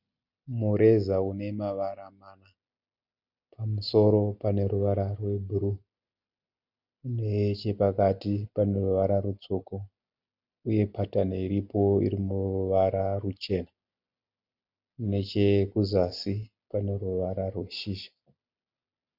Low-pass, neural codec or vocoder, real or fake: 5.4 kHz; none; real